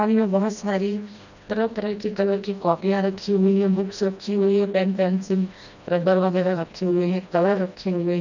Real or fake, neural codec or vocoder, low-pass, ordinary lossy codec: fake; codec, 16 kHz, 1 kbps, FreqCodec, smaller model; 7.2 kHz; none